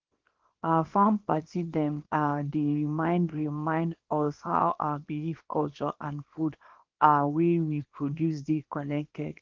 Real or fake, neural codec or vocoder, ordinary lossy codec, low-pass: fake; codec, 24 kHz, 0.9 kbps, WavTokenizer, small release; Opus, 16 kbps; 7.2 kHz